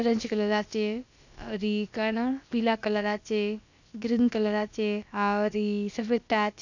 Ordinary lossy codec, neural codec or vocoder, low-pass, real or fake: none; codec, 16 kHz, about 1 kbps, DyCAST, with the encoder's durations; 7.2 kHz; fake